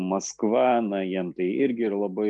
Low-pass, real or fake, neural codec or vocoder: 10.8 kHz; real; none